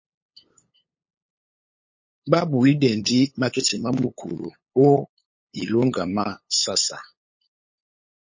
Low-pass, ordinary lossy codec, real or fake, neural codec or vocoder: 7.2 kHz; MP3, 32 kbps; fake; codec, 16 kHz, 8 kbps, FunCodec, trained on LibriTTS, 25 frames a second